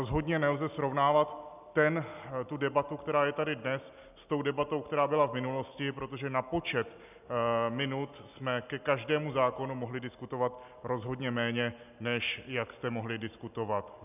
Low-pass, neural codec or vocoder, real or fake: 3.6 kHz; none; real